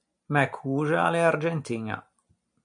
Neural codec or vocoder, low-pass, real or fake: none; 9.9 kHz; real